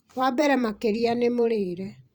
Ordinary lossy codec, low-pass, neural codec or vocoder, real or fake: Opus, 64 kbps; 19.8 kHz; vocoder, 44.1 kHz, 128 mel bands every 512 samples, BigVGAN v2; fake